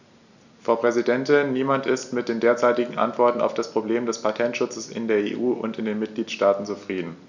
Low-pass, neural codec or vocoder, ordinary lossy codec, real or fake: 7.2 kHz; none; none; real